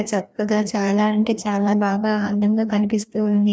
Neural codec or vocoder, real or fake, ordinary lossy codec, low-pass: codec, 16 kHz, 1 kbps, FreqCodec, larger model; fake; none; none